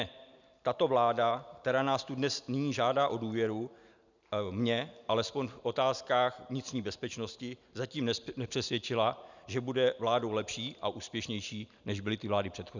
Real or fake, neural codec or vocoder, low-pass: real; none; 7.2 kHz